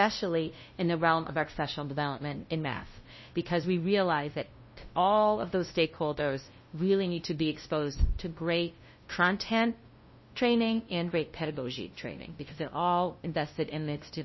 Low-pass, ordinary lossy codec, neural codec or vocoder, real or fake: 7.2 kHz; MP3, 24 kbps; codec, 16 kHz, 0.5 kbps, FunCodec, trained on LibriTTS, 25 frames a second; fake